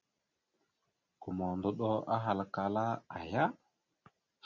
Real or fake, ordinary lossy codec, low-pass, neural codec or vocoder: real; Opus, 64 kbps; 7.2 kHz; none